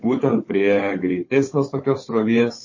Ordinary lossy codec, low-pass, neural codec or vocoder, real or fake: MP3, 32 kbps; 7.2 kHz; codec, 16 kHz, 4 kbps, FunCodec, trained on Chinese and English, 50 frames a second; fake